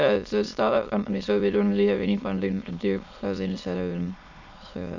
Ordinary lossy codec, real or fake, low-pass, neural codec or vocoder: none; fake; 7.2 kHz; autoencoder, 22.05 kHz, a latent of 192 numbers a frame, VITS, trained on many speakers